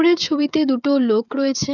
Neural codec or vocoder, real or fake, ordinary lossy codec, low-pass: codec, 16 kHz, 16 kbps, FreqCodec, smaller model; fake; none; 7.2 kHz